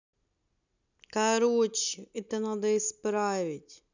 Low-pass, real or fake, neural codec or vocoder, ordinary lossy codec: 7.2 kHz; real; none; none